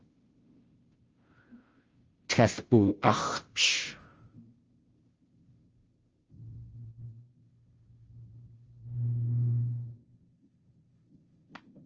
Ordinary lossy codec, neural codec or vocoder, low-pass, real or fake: Opus, 24 kbps; codec, 16 kHz, 0.5 kbps, FunCodec, trained on Chinese and English, 25 frames a second; 7.2 kHz; fake